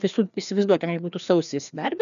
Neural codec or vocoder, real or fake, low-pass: codec, 16 kHz, 2 kbps, FreqCodec, larger model; fake; 7.2 kHz